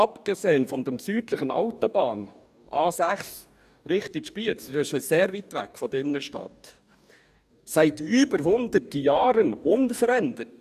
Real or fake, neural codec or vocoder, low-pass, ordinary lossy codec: fake; codec, 44.1 kHz, 2.6 kbps, DAC; 14.4 kHz; none